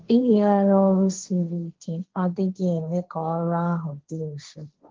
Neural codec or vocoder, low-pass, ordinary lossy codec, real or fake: codec, 16 kHz, 1.1 kbps, Voila-Tokenizer; 7.2 kHz; Opus, 16 kbps; fake